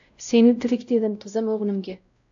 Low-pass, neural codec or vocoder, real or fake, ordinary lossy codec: 7.2 kHz; codec, 16 kHz, 0.5 kbps, X-Codec, WavLM features, trained on Multilingual LibriSpeech; fake; AAC, 64 kbps